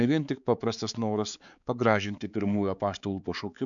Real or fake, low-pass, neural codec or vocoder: fake; 7.2 kHz; codec, 16 kHz, 4 kbps, X-Codec, HuBERT features, trained on balanced general audio